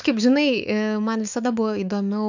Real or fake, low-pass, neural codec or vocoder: fake; 7.2 kHz; autoencoder, 48 kHz, 128 numbers a frame, DAC-VAE, trained on Japanese speech